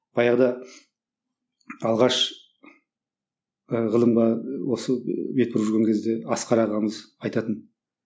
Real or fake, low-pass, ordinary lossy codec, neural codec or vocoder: real; none; none; none